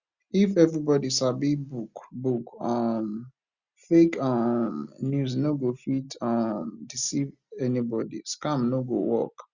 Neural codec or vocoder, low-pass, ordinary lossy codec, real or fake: none; 7.2 kHz; Opus, 64 kbps; real